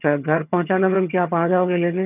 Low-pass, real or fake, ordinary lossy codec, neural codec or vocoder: 3.6 kHz; fake; none; vocoder, 22.05 kHz, 80 mel bands, HiFi-GAN